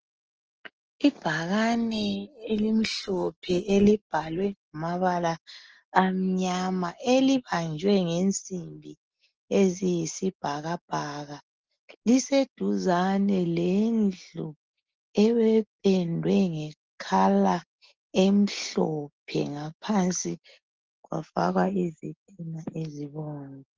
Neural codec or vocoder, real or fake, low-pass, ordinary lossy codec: none; real; 7.2 kHz; Opus, 16 kbps